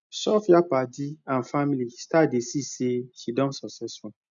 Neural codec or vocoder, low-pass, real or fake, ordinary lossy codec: none; 7.2 kHz; real; none